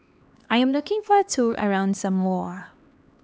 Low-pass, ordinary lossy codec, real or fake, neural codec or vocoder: none; none; fake; codec, 16 kHz, 2 kbps, X-Codec, HuBERT features, trained on LibriSpeech